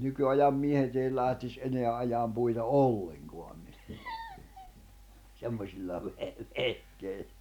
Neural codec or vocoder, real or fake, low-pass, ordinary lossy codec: none; real; 19.8 kHz; none